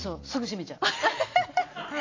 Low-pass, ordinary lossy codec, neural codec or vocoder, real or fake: 7.2 kHz; AAC, 32 kbps; none; real